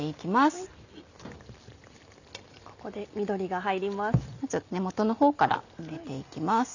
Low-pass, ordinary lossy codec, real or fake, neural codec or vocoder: 7.2 kHz; none; real; none